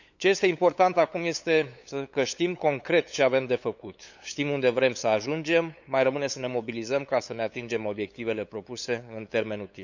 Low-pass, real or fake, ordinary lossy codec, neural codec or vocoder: 7.2 kHz; fake; none; codec, 16 kHz, 8 kbps, FunCodec, trained on LibriTTS, 25 frames a second